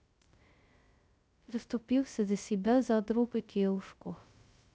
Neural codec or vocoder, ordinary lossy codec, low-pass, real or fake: codec, 16 kHz, 0.2 kbps, FocalCodec; none; none; fake